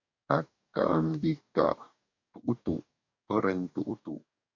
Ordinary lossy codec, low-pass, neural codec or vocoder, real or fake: MP3, 64 kbps; 7.2 kHz; codec, 44.1 kHz, 2.6 kbps, DAC; fake